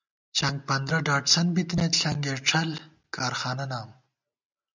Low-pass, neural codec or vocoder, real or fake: 7.2 kHz; none; real